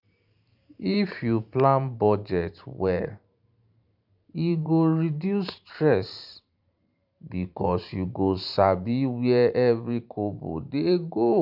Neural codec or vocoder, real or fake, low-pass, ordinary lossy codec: vocoder, 44.1 kHz, 128 mel bands every 512 samples, BigVGAN v2; fake; 5.4 kHz; none